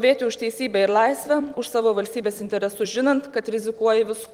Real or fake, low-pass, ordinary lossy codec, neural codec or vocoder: fake; 19.8 kHz; Opus, 24 kbps; vocoder, 44.1 kHz, 128 mel bands every 256 samples, BigVGAN v2